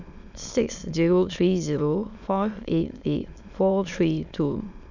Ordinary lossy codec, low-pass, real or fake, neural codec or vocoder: none; 7.2 kHz; fake; autoencoder, 22.05 kHz, a latent of 192 numbers a frame, VITS, trained on many speakers